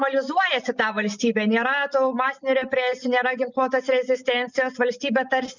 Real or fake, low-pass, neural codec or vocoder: real; 7.2 kHz; none